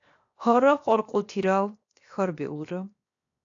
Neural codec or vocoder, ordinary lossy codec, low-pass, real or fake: codec, 16 kHz, 0.7 kbps, FocalCodec; AAC, 48 kbps; 7.2 kHz; fake